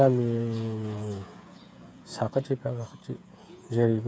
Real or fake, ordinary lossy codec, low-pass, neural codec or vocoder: fake; none; none; codec, 16 kHz, 8 kbps, FreqCodec, smaller model